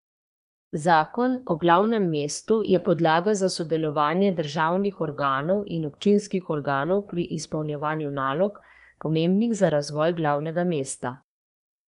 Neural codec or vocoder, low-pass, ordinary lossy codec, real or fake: codec, 24 kHz, 1 kbps, SNAC; 10.8 kHz; none; fake